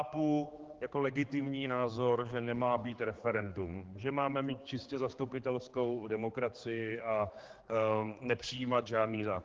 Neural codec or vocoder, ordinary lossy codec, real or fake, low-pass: codec, 16 kHz, 4 kbps, X-Codec, HuBERT features, trained on general audio; Opus, 16 kbps; fake; 7.2 kHz